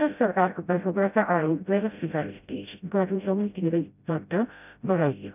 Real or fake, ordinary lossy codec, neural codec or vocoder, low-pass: fake; none; codec, 16 kHz, 0.5 kbps, FreqCodec, smaller model; 3.6 kHz